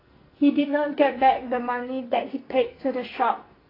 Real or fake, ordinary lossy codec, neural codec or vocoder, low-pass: fake; AAC, 24 kbps; codec, 44.1 kHz, 2.6 kbps, SNAC; 5.4 kHz